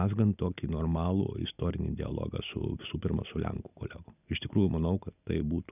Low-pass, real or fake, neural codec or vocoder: 3.6 kHz; real; none